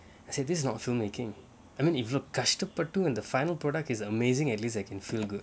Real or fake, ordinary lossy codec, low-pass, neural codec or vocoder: real; none; none; none